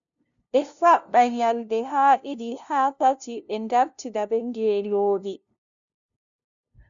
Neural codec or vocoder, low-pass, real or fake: codec, 16 kHz, 0.5 kbps, FunCodec, trained on LibriTTS, 25 frames a second; 7.2 kHz; fake